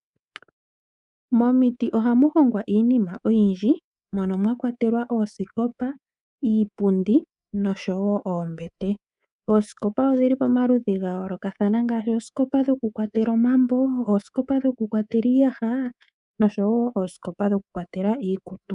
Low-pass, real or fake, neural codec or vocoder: 10.8 kHz; fake; codec, 24 kHz, 3.1 kbps, DualCodec